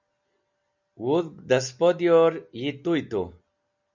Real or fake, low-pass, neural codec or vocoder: real; 7.2 kHz; none